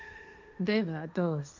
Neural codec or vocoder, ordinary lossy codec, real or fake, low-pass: codec, 16 kHz, 1.1 kbps, Voila-Tokenizer; none; fake; 7.2 kHz